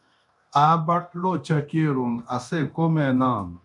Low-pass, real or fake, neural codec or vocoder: 10.8 kHz; fake; codec, 24 kHz, 0.9 kbps, DualCodec